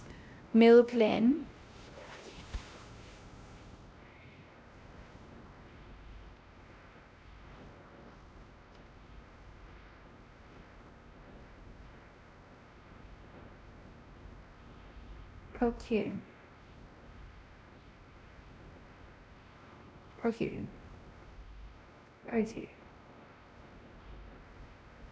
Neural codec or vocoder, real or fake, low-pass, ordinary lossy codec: codec, 16 kHz, 1 kbps, X-Codec, WavLM features, trained on Multilingual LibriSpeech; fake; none; none